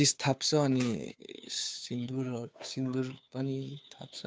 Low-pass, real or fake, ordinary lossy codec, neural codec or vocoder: none; fake; none; codec, 16 kHz, 2 kbps, FunCodec, trained on Chinese and English, 25 frames a second